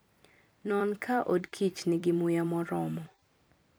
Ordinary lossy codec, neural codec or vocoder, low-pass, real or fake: none; vocoder, 44.1 kHz, 128 mel bands every 256 samples, BigVGAN v2; none; fake